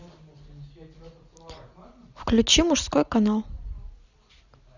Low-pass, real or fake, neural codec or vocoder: 7.2 kHz; real; none